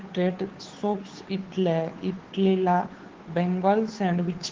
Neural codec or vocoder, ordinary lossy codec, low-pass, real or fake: codec, 16 kHz, 2 kbps, FunCodec, trained on Chinese and English, 25 frames a second; Opus, 16 kbps; 7.2 kHz; fake